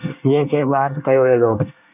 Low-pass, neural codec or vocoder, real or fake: 3.6 kHz; codec, 24 kHz, 1 kbps, SNAC; fake